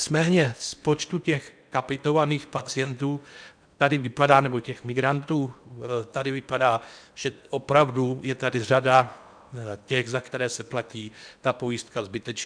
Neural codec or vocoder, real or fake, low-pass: codec, 16 kHz in and 24 kHz out, 0.8 kbps, FocalCodec, streaming, 65536 codes; fake; 9.9 kHz